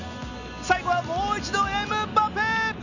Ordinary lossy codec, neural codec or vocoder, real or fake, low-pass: Opus, 64 kbps; none; real; 7.2 kHz